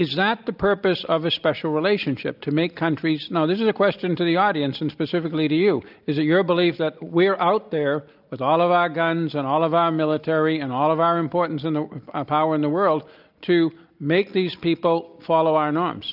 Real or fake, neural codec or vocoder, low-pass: real; none; 5.4 kHz